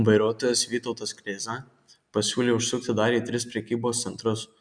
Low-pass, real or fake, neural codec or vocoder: 9.9 kHz; real; none